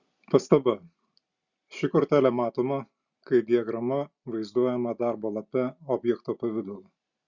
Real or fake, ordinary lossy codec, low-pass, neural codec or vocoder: real; Opus, 64 kbps; 7.2 kHz; none